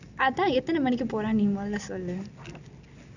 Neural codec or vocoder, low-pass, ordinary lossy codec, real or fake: none; 7.2 kHz; none; real